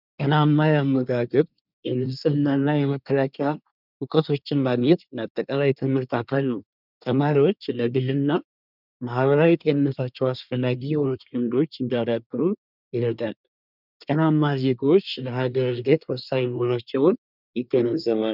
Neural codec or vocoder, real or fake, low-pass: codec, 24 kHz, 1 kbps, SNAC; fake; 5.4 kHz